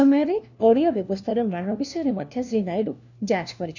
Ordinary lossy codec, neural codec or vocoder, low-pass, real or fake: none; codec, 16 kHz, 1 kbps, FunCodec, trained on LibriTTS, 50 frames a second; 7.2 kHz; fake